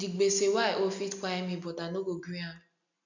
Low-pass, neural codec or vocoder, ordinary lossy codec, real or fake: 7.2 kHz; none; none; real